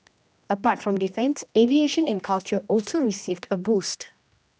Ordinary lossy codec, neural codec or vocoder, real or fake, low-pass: none; codec, 16 kHz, 1 kbps, X-Codec, HuBERT features, trained on general audio; fake; none